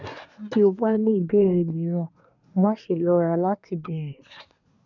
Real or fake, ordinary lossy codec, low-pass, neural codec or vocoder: fake; none; 7.2 kHz; codec, 24 kHz, 1 kbps, SNAC